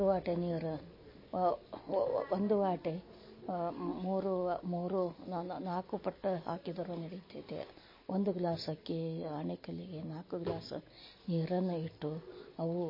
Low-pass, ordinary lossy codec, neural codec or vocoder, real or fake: 5.4 kHz; MP3, 24 kbps; none; real